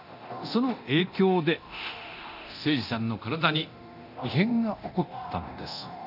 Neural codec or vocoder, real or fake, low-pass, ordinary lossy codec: codec, 24 kHz, 0.9 kbps, DualCodec; fake; 5.4 kHz; none